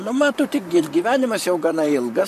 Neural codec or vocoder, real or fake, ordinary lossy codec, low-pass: vocoder, 44.1 kHz, 128 mel bands, Pupu-Vocoder; fake; MP3, 64 kbps; 14.4 kHz